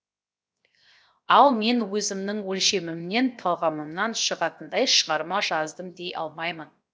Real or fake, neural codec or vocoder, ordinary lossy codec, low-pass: fake; codec, 16 kHz, 0.7 kbps, FocalCodec; none; none